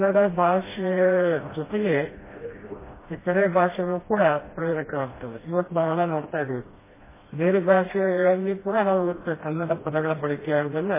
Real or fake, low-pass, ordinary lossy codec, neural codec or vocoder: fake; 3.6 kHz; MP3, 16 kbps; codec, 16 kHz, 1 kbps, FreqCodec, smaller model